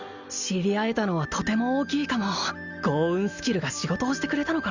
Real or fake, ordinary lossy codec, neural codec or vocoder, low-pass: real; Opus, 64 kbps; none; 7.2 kHz